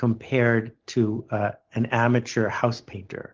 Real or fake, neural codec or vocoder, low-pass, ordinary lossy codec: real; none; 7.2 kHz; Opus, 16 kbps